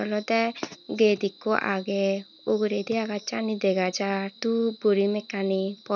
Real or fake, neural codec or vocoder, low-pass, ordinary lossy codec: real; none; 7.2 kHz; none